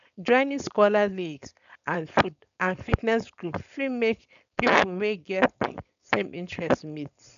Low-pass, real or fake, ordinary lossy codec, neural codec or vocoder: 7.2 kHz; fake; none; codec, 16 kHz, 4.8 kbps, FACodec